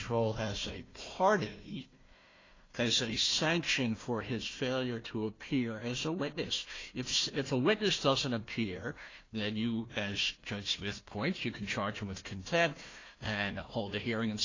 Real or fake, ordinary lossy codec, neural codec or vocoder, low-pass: fake; AAC, 32 kbps; codec, 16 kHz, 1 kbps, FunCodec, trained on Chinese and English, 50 frames a second; 7.2 kHz